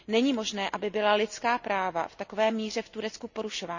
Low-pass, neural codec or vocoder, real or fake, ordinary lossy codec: 7.2 kHz; none; real; none